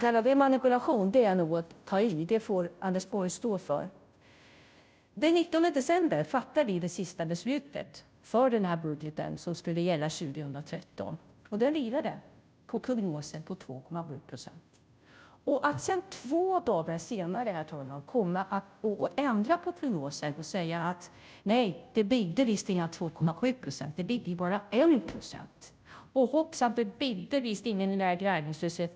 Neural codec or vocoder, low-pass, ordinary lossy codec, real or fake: codec, 16 kHz, 0.5 kbps, FunCodec, trained on Chinese and English, 25 frames a second; none; none; fake